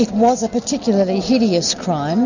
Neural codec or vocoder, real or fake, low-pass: none; real; 7.2 kHz